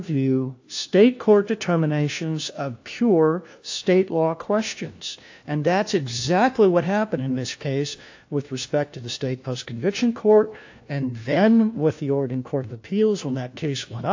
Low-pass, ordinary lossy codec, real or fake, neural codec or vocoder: 7.2 kHz; AAC, 48 kbps; fake; codec, 16 kHz, 1 kbps, FunCodec, trained on LibriTTS, 50 frames a second